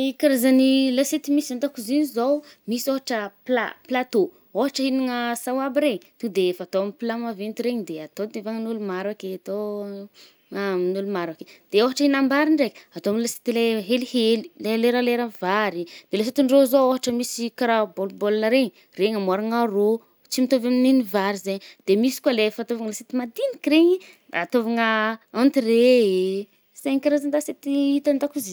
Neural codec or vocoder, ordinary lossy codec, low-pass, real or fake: none; none; none; real